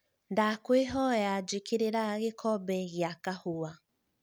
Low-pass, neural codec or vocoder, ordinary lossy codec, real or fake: none; none; none; real